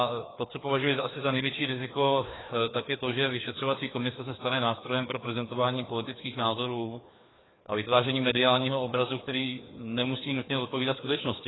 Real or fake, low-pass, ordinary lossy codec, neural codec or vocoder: fake; 7.2 kHz; AAC, 16 kbps; codec, 16 kHz, 2 kbps, FreqCodec, larger model